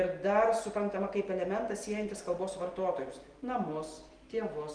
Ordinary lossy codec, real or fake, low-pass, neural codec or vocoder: Opus, 24 kbps; real; 9.9 kHz; none